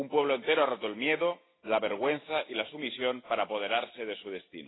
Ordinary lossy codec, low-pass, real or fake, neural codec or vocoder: AAC, 16 kbps; 7.2 kHz; real; none